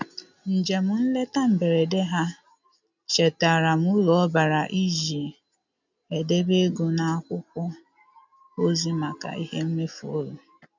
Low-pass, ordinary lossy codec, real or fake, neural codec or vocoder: 7.2 kHz; none; real; none